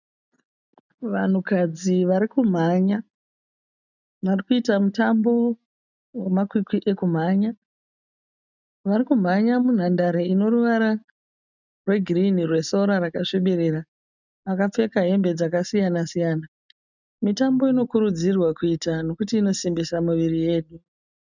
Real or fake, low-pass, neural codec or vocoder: real; 7.2 kHz; none